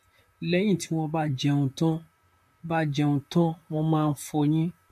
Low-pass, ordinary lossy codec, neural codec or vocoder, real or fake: 14.4 kHz; MP3, 64 kbps; vocoder, 44.1 kHz, 128 mel bands, Pupu-Vocoder; fake